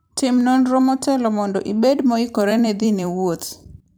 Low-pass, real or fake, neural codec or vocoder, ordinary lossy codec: none; fake; vocoder, 44.1 kHz, 128 mel bands every 256 samples, BigVGAN v2; none